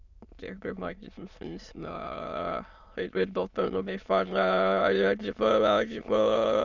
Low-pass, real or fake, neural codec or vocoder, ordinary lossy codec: 7.2 kHz; fake; autoencoder, 22.05 kHz, a latent of 192 numbers a frame, VITS, trained on many speakers; Opus, 64 kbps